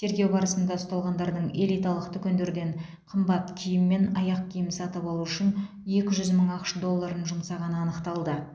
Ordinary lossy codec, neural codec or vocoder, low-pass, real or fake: none; none; none; real